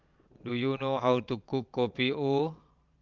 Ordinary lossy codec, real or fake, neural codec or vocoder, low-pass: Opus, 24 kbps; fake; vocoder, 22.05 kHz, 80 mel bands, Vocos; 7.2 kHz